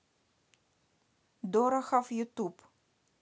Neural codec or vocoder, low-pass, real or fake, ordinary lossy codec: none; none; real; none